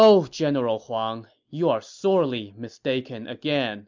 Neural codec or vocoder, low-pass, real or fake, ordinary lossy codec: none; 7.2 kHz; real; MP3, 64 kbps